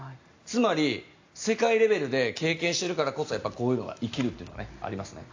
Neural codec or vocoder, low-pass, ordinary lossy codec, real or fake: none; 7.2 kHz; AAC, 48 kbps; real